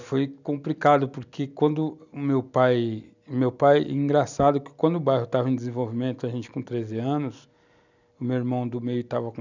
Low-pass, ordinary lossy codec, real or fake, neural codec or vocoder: 7.2 kHz; none; real; none